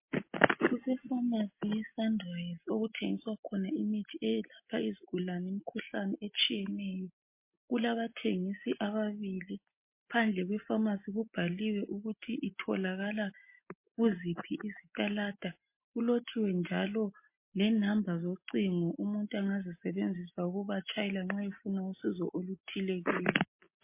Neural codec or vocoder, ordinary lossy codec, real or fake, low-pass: none; MP3, 24 kbps; real; 3.6 kHz